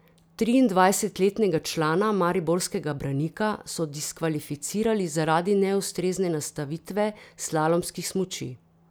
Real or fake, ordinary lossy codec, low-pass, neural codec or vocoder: real; none; none; none